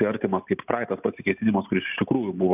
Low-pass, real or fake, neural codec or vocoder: 3.6 kHz; real; none